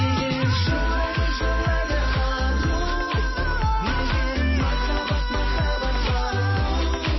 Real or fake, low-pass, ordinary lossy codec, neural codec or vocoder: real; 7.2 kHz; MP3, 24 kbps; none